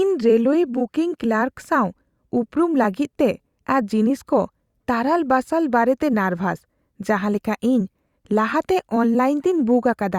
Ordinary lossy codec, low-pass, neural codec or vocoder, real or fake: Opus, 64 kbps; 19.8 kHz; vocoder, 44.1 kHz, 128 mel bands every 256 samples, BigVGAN v2; fake